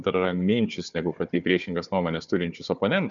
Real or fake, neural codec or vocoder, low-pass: fake; codec, 16 kHz, 4 kbps, FunCodec, trained on Chinese and English, 50 frames a second; 7.2 kHz